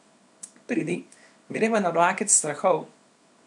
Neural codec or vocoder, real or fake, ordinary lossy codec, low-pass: codec, 24 kHz, 0.9 kbps, WavTokenizer, small release; fake; none; 10.8 kHz